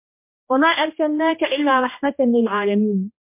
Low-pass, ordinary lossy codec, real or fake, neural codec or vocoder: 3.6 kHz; MP3, 32 kbps; fake; codec, 16 kHz, 1 kbps, X-Codec, HuBERT features, trained on general audio